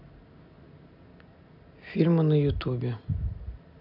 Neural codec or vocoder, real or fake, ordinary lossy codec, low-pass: none; real; none; 5.4 kHz